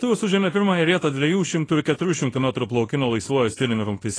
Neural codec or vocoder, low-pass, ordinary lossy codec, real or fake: codec, 24 kHz, 0.9 kbps, WavTokenizer, small release; 9.9 kHz; AAC, 32 kbps; fake